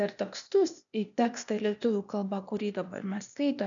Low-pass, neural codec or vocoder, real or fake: 7.2 kHz; codec, 16 kHz, 0.8 kbps, ZipCodec; fake